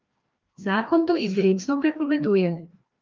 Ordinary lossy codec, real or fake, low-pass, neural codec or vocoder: Opus, 24 kbps; fake; 7.2 kHz; codec, 16 kHz, 1 kbps, FreqCodec, larger model